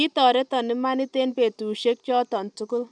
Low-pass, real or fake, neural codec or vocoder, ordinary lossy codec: 9.9 kHz; real; none; none